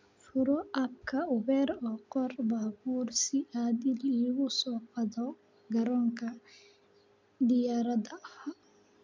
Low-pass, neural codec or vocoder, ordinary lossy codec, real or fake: 7.2 kHz; none; MP3, 64 kbps; real